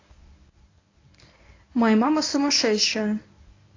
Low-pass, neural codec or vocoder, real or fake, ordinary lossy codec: 7.2 kHz; codec, 24 kHz, 0.9 kbps, WavTokenizer, medium speech release version 1; fake; AAC, 32 kbps